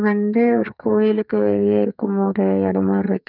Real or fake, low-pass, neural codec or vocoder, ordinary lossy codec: fake; 5.4 kHz; codec, 44.1 kHz, 2.6 kbps, SNAC; none